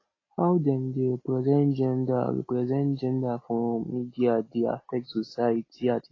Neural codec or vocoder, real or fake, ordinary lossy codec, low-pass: none; real; AAC, 32 kbps; 7.2 kHz